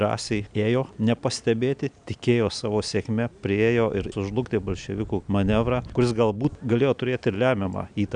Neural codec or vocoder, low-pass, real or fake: none; 9.9 kHz; real